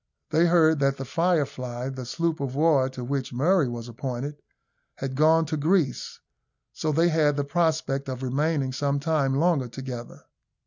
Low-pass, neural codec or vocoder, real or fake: 7.2 kHz; none; real